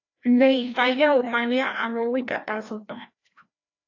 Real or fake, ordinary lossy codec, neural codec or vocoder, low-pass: fake; AAC, 48 kbps; codec, 16 kHz, 1 kbps, FreqCodec, larger model; 7.2 kHz